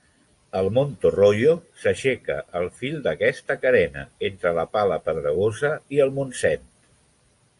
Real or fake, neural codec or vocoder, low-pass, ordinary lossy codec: real; none; 10.8 kHz; AAC, 64 kbps